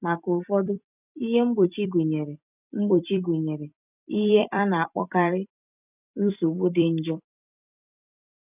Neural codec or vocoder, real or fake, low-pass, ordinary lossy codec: vocoder, 44.1 kHz, 128 mel bands every 256 samples, BigVGAN v2; fake; 3.6 kHz; none